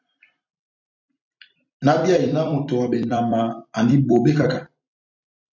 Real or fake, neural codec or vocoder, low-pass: fake; vocoder, 44.1 kHz, 128 mel bands every 256 samples, BigVGAN v2; 7.2 kHz